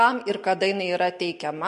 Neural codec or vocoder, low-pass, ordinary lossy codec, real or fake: none; 10.8 kHz; MP3, 48 kbps; real